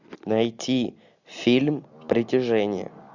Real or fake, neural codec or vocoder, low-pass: real; none; 7.2 kHz